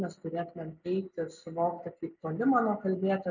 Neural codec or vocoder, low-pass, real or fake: none; 7.2 kHz; real